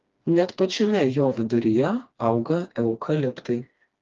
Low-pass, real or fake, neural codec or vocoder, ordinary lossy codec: 7.2 kHz; fake; codec, 16 kHz, 2 kbps, FreqCodec, smaller model; Opus, 32 kbps